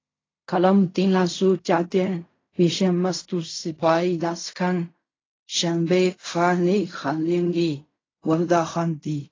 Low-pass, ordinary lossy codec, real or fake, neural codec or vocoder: 7.2 kHz; AAC, 32 kbps; fake; codec, 16 kHz in and 24 kHz out, 0.4 kbps, LongCat-Audio-Codec, fine tuned four codebook decoder